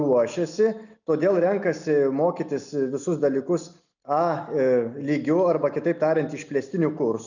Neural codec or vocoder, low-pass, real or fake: none; 7.2 kHz; real